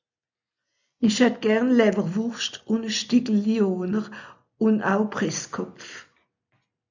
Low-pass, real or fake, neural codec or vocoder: 7.2 kHz; real; none